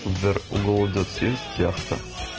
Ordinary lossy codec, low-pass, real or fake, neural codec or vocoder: Opus, 16 kbps; 7.2 kHz; real; none